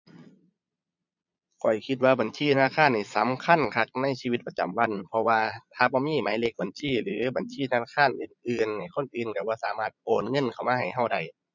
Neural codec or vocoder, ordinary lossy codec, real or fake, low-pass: vocoder, 22.05 kHz, 80 mel bands, Vocos; none; fake; 7.2 kHz